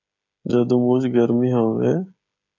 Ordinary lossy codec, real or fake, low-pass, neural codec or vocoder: MP3, 64 kbps; fake; 7.2 kHz; codec, 16 kHz, 16 kbps, FreqCodec, smaller model